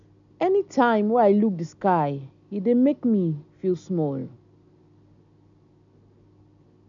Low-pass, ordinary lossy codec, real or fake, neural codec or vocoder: 7.2 kHz; none; real; none